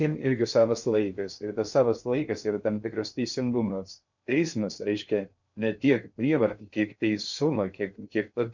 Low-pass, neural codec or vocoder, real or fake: 7.2 kHz; codec, 16 kHz in and 24 kHz out, 0.6 kbps, FocalCodec, streaming, 2048 codes; fake